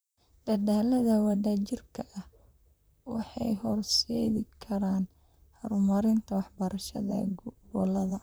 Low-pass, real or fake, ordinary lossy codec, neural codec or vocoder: none; fake; none; vocoder, 44.1 kHz, 128 mel bands, Pupu-Vocoder